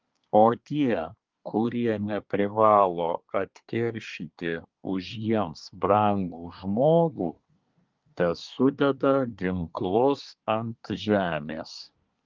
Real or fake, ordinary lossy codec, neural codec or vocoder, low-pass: fake; Opus, 24 kbps; codec, 24 kHz, 1 kbps, SNAC; 7.2 kHz